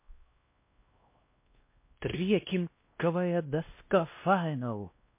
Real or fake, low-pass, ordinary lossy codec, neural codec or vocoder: fake; 3.6 kHz; MP3, 24 kbps; codec, 16 kHz, 1 kbps, X-Codec, WavLM features, trained on Multilingual LibriSpeech